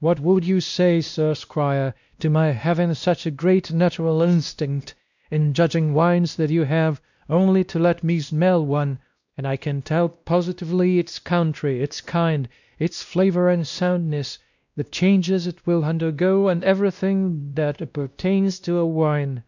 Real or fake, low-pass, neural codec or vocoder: fake; 7.2 kHz; codec, 16 kHz, 1 kbps, X-Codec, WavLM features, trained on Multilingual LibriSpeech